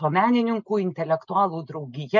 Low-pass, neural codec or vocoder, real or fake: 7.2 kHz; none; real